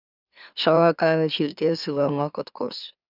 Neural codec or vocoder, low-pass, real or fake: autoencoder, 44.1 kHz, a latent of 192 numbers a frame, MeloTTS; 5.4 kHz; fake